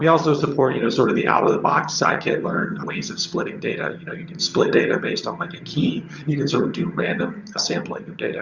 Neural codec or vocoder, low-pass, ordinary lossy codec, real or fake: vocoder, 22.05 kHz, 80 mel bands, HiFi-GAN; 7.2 kHz; Opus, 64 kbps; fake